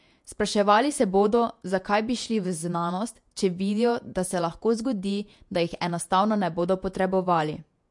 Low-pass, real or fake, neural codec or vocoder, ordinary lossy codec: 10.8 kHz; fake; vocoder, 48 kHz, 128 mel bands, Vocos; MP3, 64 kbps